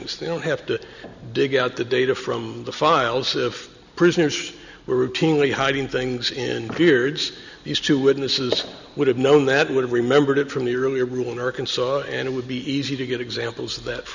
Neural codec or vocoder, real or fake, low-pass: none; real; 7.2 kHz